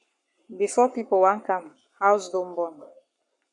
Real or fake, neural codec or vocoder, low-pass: fake; codec, 44.1 kHz, 7.8 kbps, Pupu-Codec; 10.8 kHz